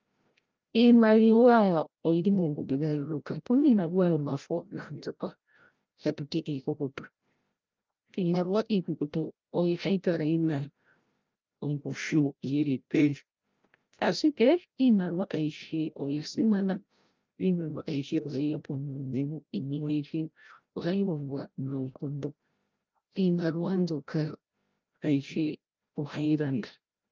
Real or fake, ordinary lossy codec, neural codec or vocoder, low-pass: fake; Opus, 24 kbps; codec, 16 kHz, 0.5 kbps, FreqCodec, larger model; 7.2 kHz